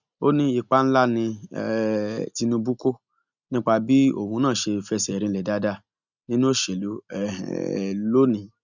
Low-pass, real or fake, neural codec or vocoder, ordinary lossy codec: 7.2 kHz; real; none; none